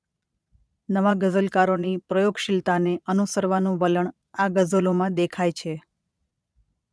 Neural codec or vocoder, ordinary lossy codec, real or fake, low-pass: vocoder, 22.05 kHz, 80 mel bands, Vocos; none; fake; none